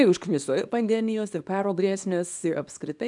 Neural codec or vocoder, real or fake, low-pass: codec, 24 kHz, 0.9 kbps, WavTokenizer, small release; fake; 10.8 kHz